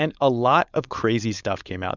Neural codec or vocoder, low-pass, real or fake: codec, 16 kHz, 4.8 kbps, FACodec; 7.2 kHz; fake